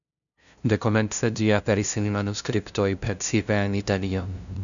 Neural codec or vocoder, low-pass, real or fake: codec, 16 kHz, 0.5 kbps, FunCodec, trained on LibriTTS, 25 frames a second; 7.2 kHz; fake